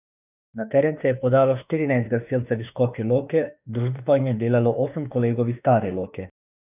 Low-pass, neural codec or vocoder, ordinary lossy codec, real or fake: 3.6 kHz; autoencoder, 48 kHz, 32 numbers a frame, DAC-VAE, trained on Japanese speech; none; fake